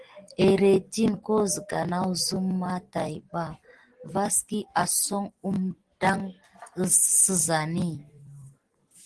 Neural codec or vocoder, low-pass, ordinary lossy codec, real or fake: none; 10.8 kHz; Opus, 16 kbps; real